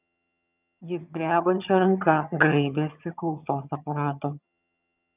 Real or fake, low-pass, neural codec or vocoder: fake; 3.6 kHz; vocoder, 22.05 kHz, 80 mel bands, HiFi-GAN